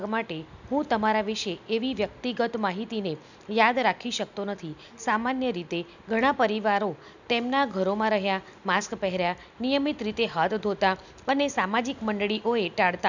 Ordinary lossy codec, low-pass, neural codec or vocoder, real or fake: none; 7.2 kHz; none; real